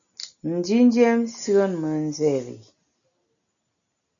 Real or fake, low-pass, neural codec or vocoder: real; 7.2 kHz; none